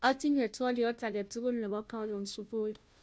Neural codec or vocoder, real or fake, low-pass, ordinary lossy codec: codec, 16 kHz, 0.5 kbps, FunCodec, trained on Chinese and English, 25 frames a second; fake; none; none